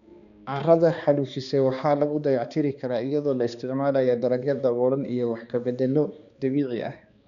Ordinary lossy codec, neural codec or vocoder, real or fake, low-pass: none; codec, 16 kHz, 2 kbps, X-Codec, HuBERT features, trained on balanced general audio; fake; 7.2 kHz